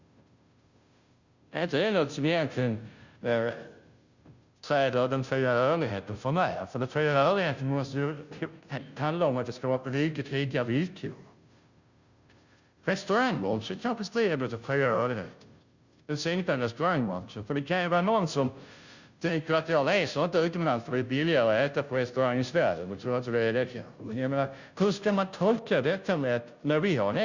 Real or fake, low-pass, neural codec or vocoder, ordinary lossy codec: fake; 7.2 kHz; codec, 16 kHz, 0.5 kbps, FunCodec, trained on Chinese and English, 25 frames a second; Opus, 64 kbps